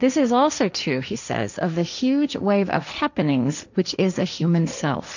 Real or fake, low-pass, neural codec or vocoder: fake; 7.2 kHz; codec, 16 kHz, 1.1 kbps, Voila-Tokenizer